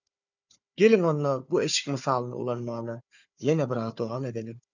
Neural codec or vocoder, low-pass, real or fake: codec, 16 kHz, 4 kbps, FunCodec, trained on Chinese and English, 50 frames a second; 7.2 kHz; fake